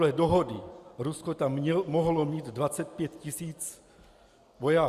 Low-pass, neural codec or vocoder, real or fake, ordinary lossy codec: 14.4 kHz; none; real; Opus, 64 kbps